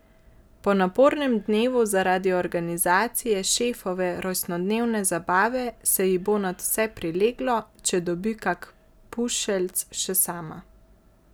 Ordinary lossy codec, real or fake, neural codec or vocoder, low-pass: none; real; none; none